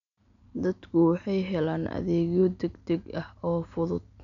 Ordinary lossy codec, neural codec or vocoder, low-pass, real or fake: none; none; 7.2 kHz; real